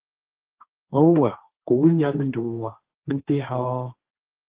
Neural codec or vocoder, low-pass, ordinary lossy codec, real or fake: codec, 16 kHz, 1.1 kbps, Voila-Tokenizer; 3.6 kHz; Opus, 16 kbps; fake